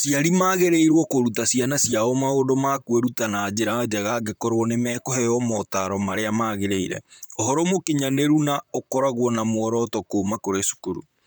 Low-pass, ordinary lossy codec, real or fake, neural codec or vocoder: none; none; fake; vocoder, 44.1 kHz, 128 mel bands, Pupu-Vocoder